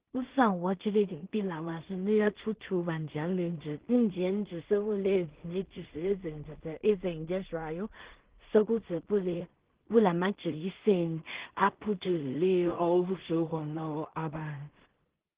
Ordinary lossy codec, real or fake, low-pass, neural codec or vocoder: Opus, 16 kbps; fake; 3.6 kHz; codec, 16 kHz in and 24 kHz out, 0.4 kbps, LongCat-Audio-Codec, two codebook decoder